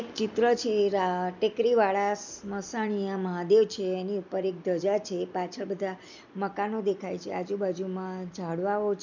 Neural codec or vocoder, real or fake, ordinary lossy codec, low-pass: none; real; none; 7.2 kHz